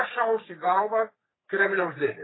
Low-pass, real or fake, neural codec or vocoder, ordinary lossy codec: 7.2 kHz; fake; codec, 44.1 kHz, 3.4 kbps, Pupu-Codec; AAC, 16 kbps